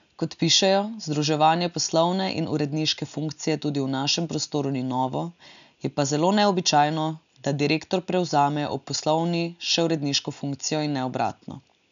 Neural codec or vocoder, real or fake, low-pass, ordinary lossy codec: none; real; 7.2 kHz; MP3, 96 kbps